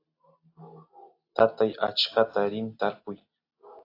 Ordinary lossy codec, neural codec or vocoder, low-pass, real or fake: AAC, 32 kbps; none; 5.4 kHz; real